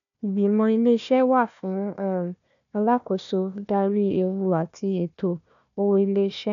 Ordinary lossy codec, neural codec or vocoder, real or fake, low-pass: none; codec, 16 kHz, 1 kbps, FunCodec, trained on Chinese and English, 50 frames a second; fake; 7.2 kHz